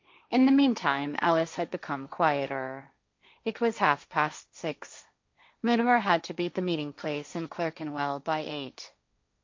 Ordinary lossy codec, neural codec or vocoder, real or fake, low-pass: MP3, 64 kbps; codec, 16 kHz, 1.1 kbps, Voila-Tokenizer; fake; 7.2 kHz